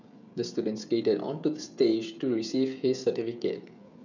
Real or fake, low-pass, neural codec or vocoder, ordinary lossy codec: fake; 7.2 kHz; codec, 16 kHz, 16 kbps, FreqCodec, smaller model; none